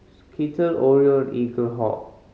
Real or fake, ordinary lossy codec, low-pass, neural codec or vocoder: real; none; none; none